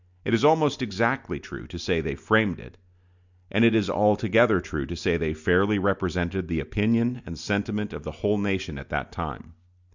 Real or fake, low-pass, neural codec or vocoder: real; 7.2 kHz; none